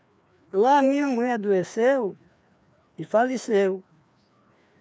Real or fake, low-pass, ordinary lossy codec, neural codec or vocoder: fake; none; none; codec, 16 kHz, 2 kbps, FreqCodec, larger model